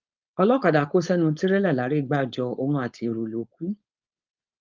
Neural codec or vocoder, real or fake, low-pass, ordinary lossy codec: codec, 16 kHz, 4.8 kbps, FACodec; fake; 7.2 kHz; Opus, 24 kbps